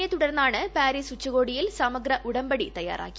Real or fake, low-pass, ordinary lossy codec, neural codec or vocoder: real; none; none; none